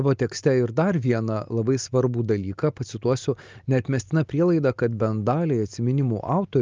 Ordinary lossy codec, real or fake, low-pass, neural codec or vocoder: Opus, 24 kbps; real; 7.2 kHz; none